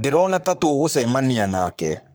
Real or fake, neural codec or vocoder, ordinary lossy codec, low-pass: fake; codec, 44.1 kHz, 3.4 kbps, Pupu-Codec; none; none